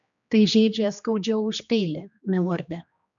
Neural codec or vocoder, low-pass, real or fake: codec, 16 kHz, 2 kbps, X-Codec, HuBERT features, trained on general audio; 7.2 kHz; fake